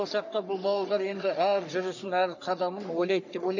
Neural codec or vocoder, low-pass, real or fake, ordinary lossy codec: codec, 44.1 kHz, 3.4 kbps, Pupu-Codec; 7.2 kHz; fake; none